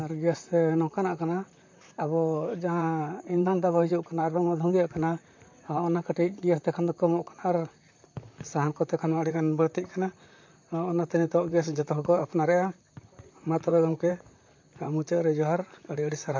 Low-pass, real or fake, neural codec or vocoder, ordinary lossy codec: 7.2 kHz; fake; vocoder, 44.1 kHz, 128 mel bands, Pupu-Vocoder; MP3, 48 kbps